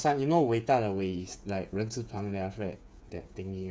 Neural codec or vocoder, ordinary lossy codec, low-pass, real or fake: codec, 16 kHz, 8 kbps, FreqCodec, smaller model; none; none; fake